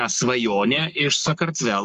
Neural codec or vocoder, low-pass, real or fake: codec, 44.1 kHz, 7.8 kbps, Pupu-Codec; 14.4 kHz; fake